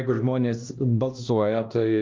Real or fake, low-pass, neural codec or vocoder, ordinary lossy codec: fake; 7.2 kHz; codec, 16 kHz, 1 kbps, X-Codec, HuBERT features, trained on LibriSpeech; Opus, 24 kbps